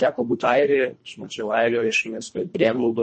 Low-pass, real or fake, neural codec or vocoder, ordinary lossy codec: 10.8 kHz; fake; codec, 24 kHz, 1.5 kbps, HILCodec; MP3, 32 kbps